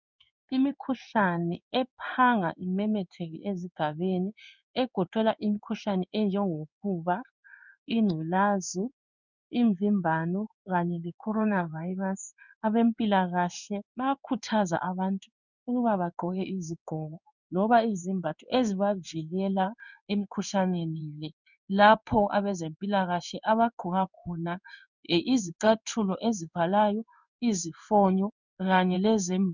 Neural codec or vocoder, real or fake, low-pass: codec, 16 kHz in and 24 kHz out, 1 kbps, XY-Tokenizer; fake; 7.2 kHz